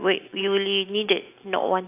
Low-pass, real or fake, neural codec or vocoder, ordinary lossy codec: 3.6 kHz; real; none; AAC, 24 kbps